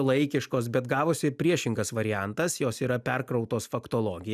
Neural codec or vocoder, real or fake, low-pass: none; real; 14.4 kHz